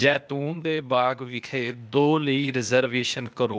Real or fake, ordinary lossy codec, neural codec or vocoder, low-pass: fake; none; codec, 16 kHz, 0.8 kbps, ZipCodec; none